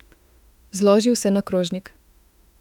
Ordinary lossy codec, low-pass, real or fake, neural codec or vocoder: none; 19.8 kHz; fake; autoencoder, 48 kHz, 32 numbers a frame, DAC-VAE, trained on Japanese speech